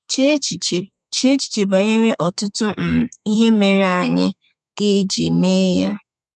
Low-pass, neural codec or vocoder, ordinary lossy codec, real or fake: 10.8 kHz; codec, 32 kHz, 1.9 kbps, SNAC; MP3, 96 kbps; fake